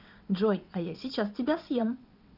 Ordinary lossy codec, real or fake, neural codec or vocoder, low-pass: none; fake; vocoder, 22.05 kHz, 80 mel bands, WaveNeXt; 5.4 kHz